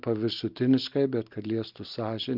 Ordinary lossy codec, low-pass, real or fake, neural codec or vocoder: Opus, 32 kbps; 5.4 kHz; real; none